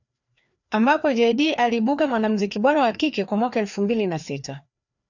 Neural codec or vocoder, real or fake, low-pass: codec, 16 kHz, 2 kbps, FreqCodec, larger model; fake; 7.2 kHz